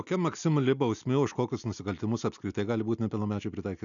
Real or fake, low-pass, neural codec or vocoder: real; 7.2 kHz; none